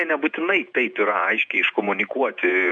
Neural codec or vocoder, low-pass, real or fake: vocoder, 48 kHz, 128 mel bands, Vocos; 9.9 kHz; fake